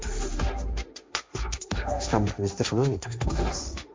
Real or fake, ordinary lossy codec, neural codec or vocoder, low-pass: fake; none; codec, 16 kHz, 1.1 kbps, Voila-Tokenizer; none